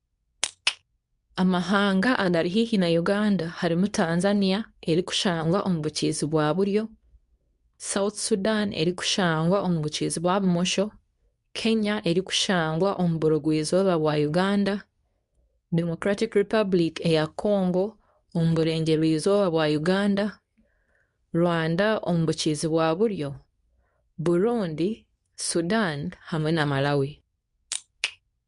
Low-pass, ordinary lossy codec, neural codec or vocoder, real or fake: 10.8 kHz; none; codec, 24 kHz, 0.9 kbps, WavTokenizer, medium speech release version 2; fake